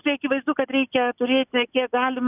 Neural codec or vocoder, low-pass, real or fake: none; 3.6 kHz; real